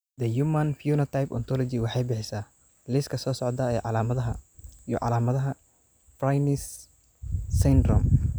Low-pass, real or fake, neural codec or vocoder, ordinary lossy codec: none; real; none; none